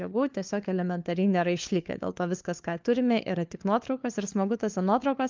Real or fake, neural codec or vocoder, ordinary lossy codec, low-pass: fake; codec, 16 kHz, 4 kbps, FunCodec, trained on LibriTTS, 50 frames a second; Opus, 24 kbps; 7.2 kHz